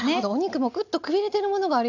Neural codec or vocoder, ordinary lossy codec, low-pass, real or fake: none; none; 7.2 kHz; real